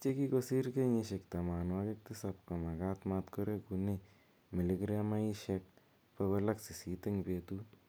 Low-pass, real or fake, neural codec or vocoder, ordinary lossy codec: none; real; none; none